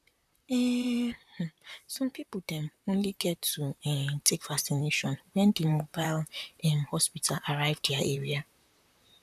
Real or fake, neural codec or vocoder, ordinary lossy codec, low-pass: fake; vocoder, 44.1 kHz, 128 mel bands, Pupu-Vocoder; none; 14.4 kHz